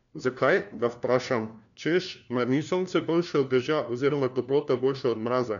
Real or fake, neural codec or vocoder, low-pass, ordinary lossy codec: fake; codec, 16 kHz, 1 kbps, FunCodec, trained on LibriTTS, 50 frames a second; 7.2 kHz; none